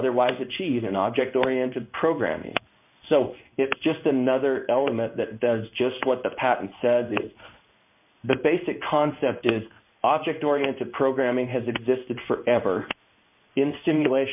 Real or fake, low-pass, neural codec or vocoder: fake; 3.6 kHz; codec, 16 kHz in and 24 kHz out, 1 kbps, XY-Tokenizer